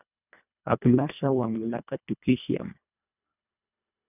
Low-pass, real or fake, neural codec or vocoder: 3.6 kHz; fake; codec, 24 kHz, 1.5 kbps, HILCodec